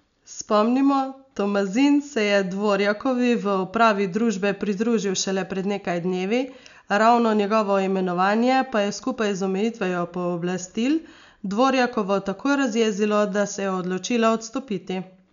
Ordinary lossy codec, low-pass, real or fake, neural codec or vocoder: none; 7.2 kHz; real; none